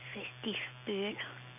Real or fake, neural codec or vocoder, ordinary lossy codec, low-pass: real; none; none; 3.6 kHz